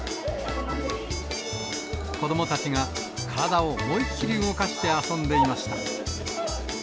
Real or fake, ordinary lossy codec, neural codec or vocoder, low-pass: real; none; none; none